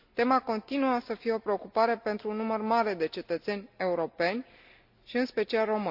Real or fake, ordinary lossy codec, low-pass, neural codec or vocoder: real; none; 5.4 kHz; none